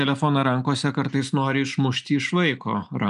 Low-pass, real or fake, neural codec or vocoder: 10.8 kHz; real; none